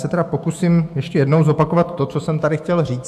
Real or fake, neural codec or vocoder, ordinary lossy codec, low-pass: fake; autoencoder, 48 kHz, 128 numbers a frame, DAC-VAE, trained on Japanese speech; AAC, 96 kbps; 14.4 kHz